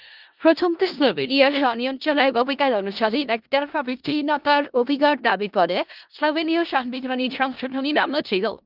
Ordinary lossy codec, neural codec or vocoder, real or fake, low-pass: Opus, 24 kbps; codec, 16 kHz in and 24 kHz out, 0.4 kbps, LongCat-Audio-Codec, four codebook decoder; fake; 5.4 kHz